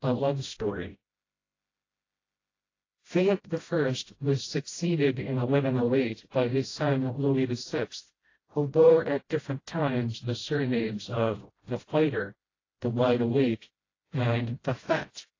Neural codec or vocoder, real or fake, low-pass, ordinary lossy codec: codec, 16 kHz, 0.5 kbps, FreqCodec, smaller model; fake; 7.2 kHz; AAC, 32 kbps